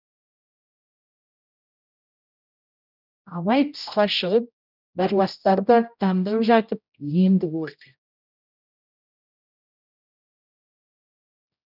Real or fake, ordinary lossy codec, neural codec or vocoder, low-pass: fake; none; codec, 16 kHz, 0.5 kbps, X-Codec, HuBERT features, trained on general audio; 5.4 kHz